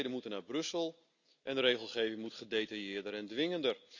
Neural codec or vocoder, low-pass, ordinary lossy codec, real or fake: none; 7.2 kHz; none; real